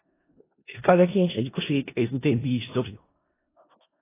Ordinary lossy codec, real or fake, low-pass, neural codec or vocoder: AAC, 16 kbps; fake; 3.6 kHz; codec, 16 kHz in and 24 kHz out, 0.4 kbps, LongCat-Audio-Codec, four codebook decoder